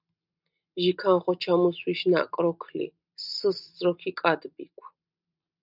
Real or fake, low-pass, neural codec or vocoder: real; 5.4 kHz; none